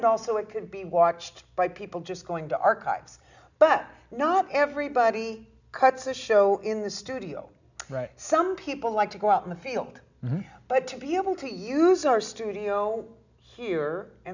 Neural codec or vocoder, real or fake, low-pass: none; real; 7.2 kHz